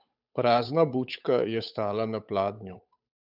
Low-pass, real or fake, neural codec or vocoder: 5.4 kHz; fake; codec, 16 kHz, 8 kbps, FunCodec, trained on Chinese and English, 25 frames a second